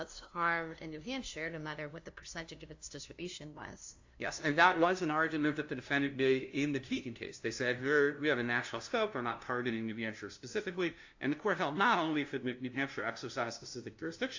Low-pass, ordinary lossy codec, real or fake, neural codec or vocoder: 7.2 kHz; AAC, 48 kbps; fake; codec, 16 kHz, 0.5 kbps, FunCodec, trained on LibriTTS, 25 frames a second